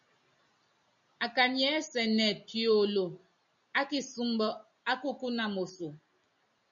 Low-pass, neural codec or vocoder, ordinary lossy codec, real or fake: 7.2 kHz; none; MP3, 96 kbps; real